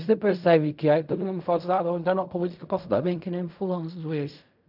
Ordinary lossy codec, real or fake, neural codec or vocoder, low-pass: none; fake; codec, 16 kHz in and 24 kHz out, 0.4 kbps, LongCat-Audio-Codec, fine tuned four codebook decoder; 5.4 kHz